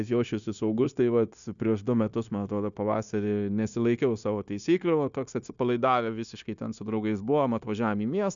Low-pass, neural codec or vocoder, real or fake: 7.2 kHz; codec, 16 kHz, 0.9 kbps, LongCat-Audio-Codec; fake